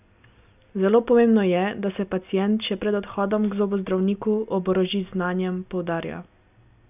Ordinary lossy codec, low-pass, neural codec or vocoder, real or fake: none; 3.6 kHz; none; real